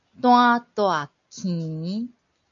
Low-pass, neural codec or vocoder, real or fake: 7.2 kHz; none; real